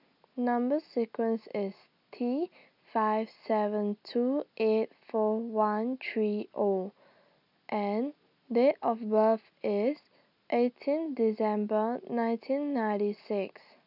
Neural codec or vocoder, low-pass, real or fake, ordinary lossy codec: none; 5.4 kHz; real; none